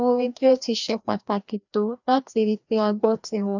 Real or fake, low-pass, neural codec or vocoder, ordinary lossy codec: fake; 7.2 kHz; codec, 16 kHz, 1 kbps, FreqCodec, larger model; none